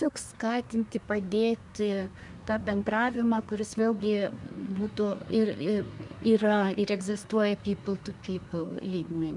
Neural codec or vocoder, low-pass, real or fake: codec, 24 kHz, 1 kbps, SNAC; 10.8 kHz; fake